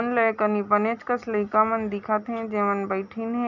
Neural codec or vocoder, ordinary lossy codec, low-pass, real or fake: none; none; 7.2 kHz; real